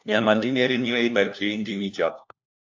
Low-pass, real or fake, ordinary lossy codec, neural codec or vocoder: 7.2 kHz; fake; AAC, 48 kbps; codec, 16 kHz, 1 kbps, FunCodec, trained on LibriTTS, 50 frames a second